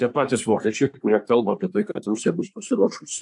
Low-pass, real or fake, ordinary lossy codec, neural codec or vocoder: 10.8 kHz; fake; AAC, 64 kbps; codec, 24 kHz, 1 kbps, SNAC